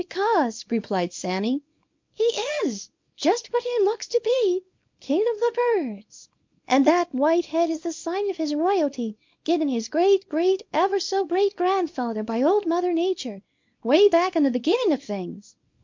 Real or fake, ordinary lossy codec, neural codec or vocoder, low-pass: fake; MP3, 48 kbps; codec, 24 kHz, 0.9 kbps, WavTokenizer, small release; 7.2 kHz